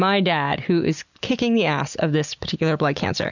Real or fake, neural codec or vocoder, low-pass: real; none; 7.2 kHz